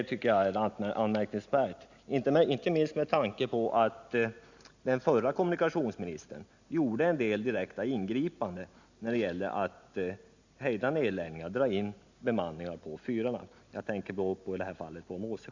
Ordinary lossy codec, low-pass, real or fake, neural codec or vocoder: Opus, 64 kbps; 7.2 kHz; real; none